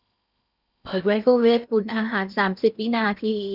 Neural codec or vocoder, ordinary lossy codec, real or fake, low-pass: codec, 16 kHz in and 24 kHz out, 0.8 kbps, FocalCodec, streaming, 65536 codes; none; fake; 5.4 kHz